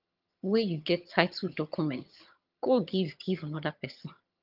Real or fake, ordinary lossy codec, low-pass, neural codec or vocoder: fake; Opus, 32 kbps; 5.4 kHz; vocoder, 22.05 kHz, 80 mel bands, HiFi-GAN